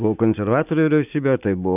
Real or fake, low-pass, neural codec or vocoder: real; 3.6 kHz; none